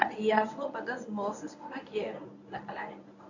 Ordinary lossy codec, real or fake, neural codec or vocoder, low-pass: none; fake; codec, 24 kHz, 0.9 kbps, WavTokenizer, medium speech release version 1; 7.2 kHz